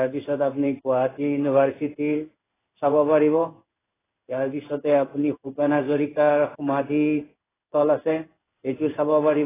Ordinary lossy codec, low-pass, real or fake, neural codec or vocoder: AAC, 16 kbps; 3.6 kHz; fake; codec, 16 kHz in and 24 kHz out, 1 kbps, XY-Tokenizer